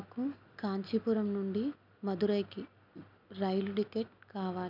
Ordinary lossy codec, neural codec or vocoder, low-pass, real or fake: none; none; 5.4 kHz; real